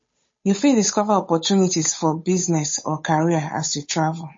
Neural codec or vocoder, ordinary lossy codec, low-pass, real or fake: codec, 16 kHz, 8 kbps, FunCodec, trained on Chinese and English, 25 frames a second; MP3, 32 kbps; 7.2 kHz; fake